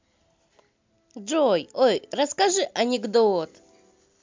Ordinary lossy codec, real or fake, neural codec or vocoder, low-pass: MP3, 64 kbps; real; none; 7.2 kHz